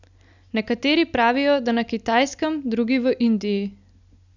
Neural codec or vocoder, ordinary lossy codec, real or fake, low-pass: none; none; real; 7.2 kHz